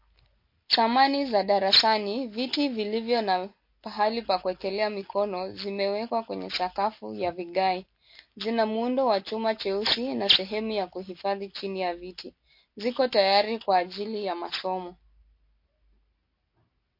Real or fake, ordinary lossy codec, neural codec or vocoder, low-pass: real; MP3, 32 kbps; none; 5.4 kHz